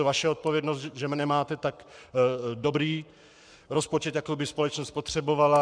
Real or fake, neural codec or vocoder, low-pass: fake; codec, 44.1 kHz, 7.8 kbps, Pupu-Codec; 9.9 kHz